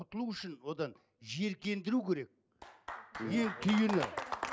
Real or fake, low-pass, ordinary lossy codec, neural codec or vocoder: real; none; none; none